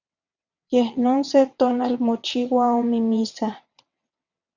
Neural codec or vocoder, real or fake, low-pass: vocoder, 22.05 kHz, 80 mel bands, WaveNeXt; fake; 7.2 kHz